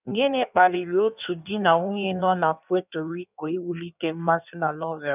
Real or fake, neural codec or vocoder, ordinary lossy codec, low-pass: fake; codec, 16 kHz in and 24 kHz out, 1.1 kbps, FireRedTTS-2 codec; none; 3.6 kHz